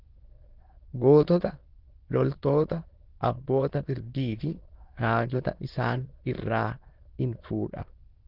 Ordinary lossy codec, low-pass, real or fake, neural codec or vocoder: Opus, 16 kbps; 5.4 kHz; fake; autoencoder, 22.05 kHz, a latent of 192 numbers a frame, VITS, trained on many speakers